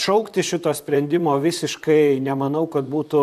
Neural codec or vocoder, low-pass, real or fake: vocoder, 44.1 kHz, 128 mel bands, Pupu-Vocoder; 14.4 kHz; fake